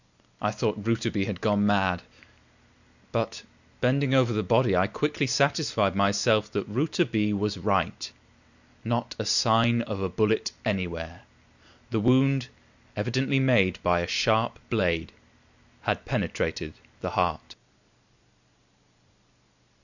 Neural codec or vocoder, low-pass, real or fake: none; 7.2 kHz; real